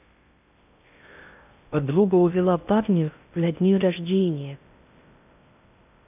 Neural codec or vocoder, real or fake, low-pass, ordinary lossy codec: codec, 16 kHz in and 24 kHz out, 0.6 kbps, FocalCodec, streaming, 2048 codes; fake; 3.6 kHz; none